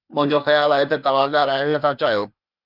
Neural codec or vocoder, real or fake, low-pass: codec, 16 kHz, 0.8 kbps, ZipCodec; fake; 5.4 kHz